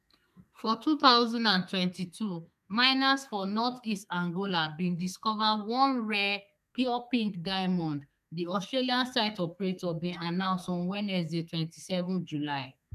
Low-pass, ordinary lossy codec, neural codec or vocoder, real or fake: 14.4 kHz; MP3, 96 kbps; codec, 32 kHz, 1.9 kbps, SNAC; fake